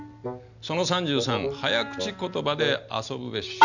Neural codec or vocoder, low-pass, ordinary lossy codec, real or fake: none; 7.2 kHz; none; real